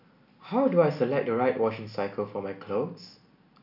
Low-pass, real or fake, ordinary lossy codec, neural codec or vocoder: 5.4 kHz; real; none; none